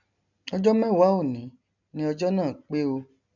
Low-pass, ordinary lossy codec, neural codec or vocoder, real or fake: 7.2 kHz; none; none; real